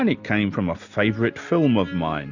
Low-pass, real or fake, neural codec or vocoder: 7.2 kHz; real; none